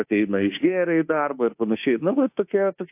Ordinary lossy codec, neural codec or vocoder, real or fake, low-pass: AAC, 32 kbps; autoencoder, 48 kHz, 32 numbers a frame, DAC-VAE, trained on Japanese speech; fake; 3.6 kHz